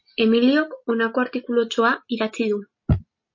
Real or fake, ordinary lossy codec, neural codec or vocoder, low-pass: real; MP3, 32 kbps; none; 7.2 kHz